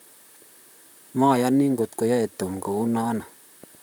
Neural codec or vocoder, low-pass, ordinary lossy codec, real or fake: vocoder, 44.1 kHz, 128 mel bands, Pupu-Vocoder; none; none; fake